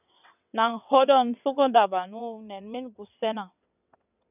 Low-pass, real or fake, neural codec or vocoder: 3.6 kHz; fake; vocoder, 22.05 kHz, 80 mel bands, WaveNeXt